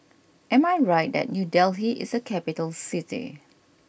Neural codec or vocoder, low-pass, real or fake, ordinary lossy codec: none; none; real; none